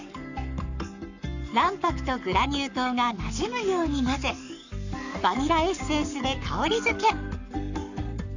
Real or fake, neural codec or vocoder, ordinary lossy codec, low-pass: fake; codec, 44.1 kHz, 7.8 kbps, Pupu-Codec; none; 7.2 kHz